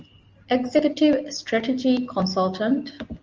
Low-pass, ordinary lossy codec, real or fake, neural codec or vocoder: 7.2 kHz; Opus, 24 kbps; real; none